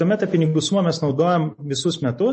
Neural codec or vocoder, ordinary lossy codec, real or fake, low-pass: none; MP3, 32 kbps; real; 10.8 kHz